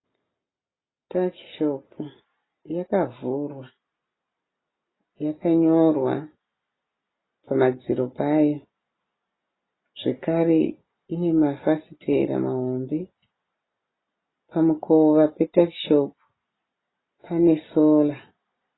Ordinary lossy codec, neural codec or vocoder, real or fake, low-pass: AAC, 16 kbps; none; real; 7.2 kHz